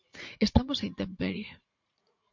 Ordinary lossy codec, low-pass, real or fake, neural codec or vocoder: MP3, 48 kbps; 7.2 kHz; fake; vocoder, 44.1 kHz, 80 mel bands, Vocos